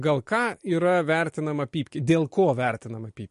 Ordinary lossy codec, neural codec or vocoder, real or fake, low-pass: MP3, 48 kbps; none; real; 10.8 kHz